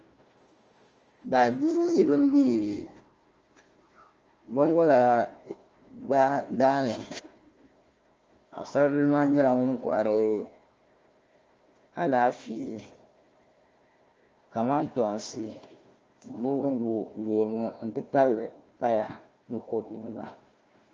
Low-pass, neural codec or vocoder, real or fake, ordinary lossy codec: 7.2 kHz; codec, 16 kHz, 1 kbps, FunCodec, trained on Chinese and English, 50 frames a second; fake; Opus, 24 kbps